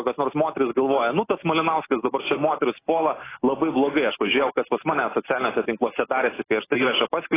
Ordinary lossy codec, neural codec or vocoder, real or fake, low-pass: AAC, 16 kbps; none; real; 3.6 kHz